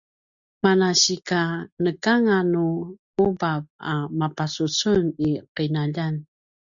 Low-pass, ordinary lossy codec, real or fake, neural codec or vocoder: 7.2 kHz; Opus, 64 kbps; real; none